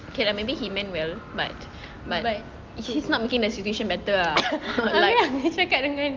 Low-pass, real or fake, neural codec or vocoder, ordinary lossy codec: 7.2 kHz; real; none; Opus, 32 kbps